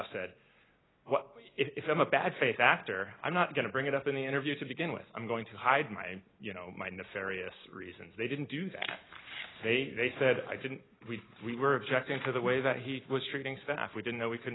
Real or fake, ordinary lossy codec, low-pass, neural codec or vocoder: real; AAC, 16 kbps; 7.2 kHz; none